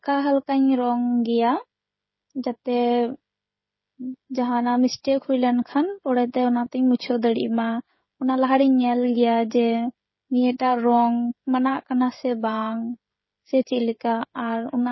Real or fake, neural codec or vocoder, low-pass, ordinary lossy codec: fake; codec, 16 kHz, 16 kbps, FreqCodec, smaller model; 7.2 kHz; MP3, 24 kbps